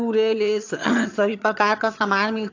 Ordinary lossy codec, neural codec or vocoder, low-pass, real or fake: AAC, 48 kbps; vocoder, 22.05 kHz, 80 mel bands, HiFi-GAN; 7.2 kHz; fake